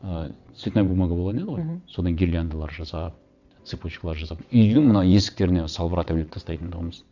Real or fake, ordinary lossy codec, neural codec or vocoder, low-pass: fake; none; vocoder, 22.05 kHz, 80 mel bands, WaveNeXt; 7.2 kHz